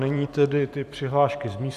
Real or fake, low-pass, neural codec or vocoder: fake; 14.4 kHz; vocoder, 44.1 kHz, 128 mel bands every 512 samples, BigVGAN v2